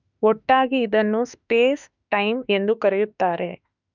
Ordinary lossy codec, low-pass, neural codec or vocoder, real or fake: none; 7.2 kHz; autoencoder, 48 kHz, 32 numbers a frame, DAC-VAE, trained on Japanese speech; fake